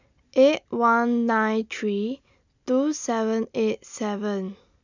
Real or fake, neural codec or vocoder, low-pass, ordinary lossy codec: real; none; 7.2 kHz; none